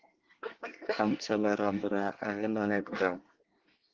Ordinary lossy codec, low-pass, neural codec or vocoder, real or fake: Opus, 16 kbps; 7.2 kHz; codec, 24 kHz, 1 kbps, SNAC; fake